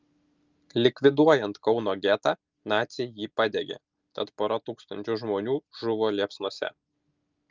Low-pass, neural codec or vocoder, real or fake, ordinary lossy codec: 7.2 kHz; none; real; Opus, 24 kbps